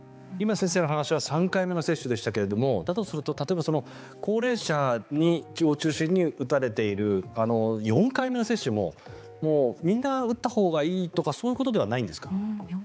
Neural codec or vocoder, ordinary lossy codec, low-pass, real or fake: codec, 16 kHz, 4 kbps, X-Codec, HuBERT features, trained on balanced general audio; none; none; fake